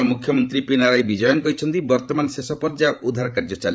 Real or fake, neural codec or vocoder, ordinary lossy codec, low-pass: fake; codec, 16 kHz, 8 kbps, FreqCodec, larger model; none; none